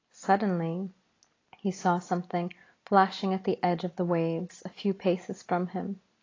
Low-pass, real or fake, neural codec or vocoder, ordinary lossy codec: 7.2 kHz; real; none; AAC, 32 kbps